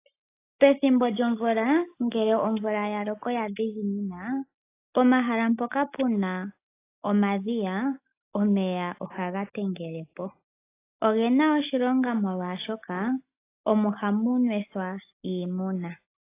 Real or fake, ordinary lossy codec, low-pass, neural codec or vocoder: real; AAC, 24 kbps; 3.6 kHz; none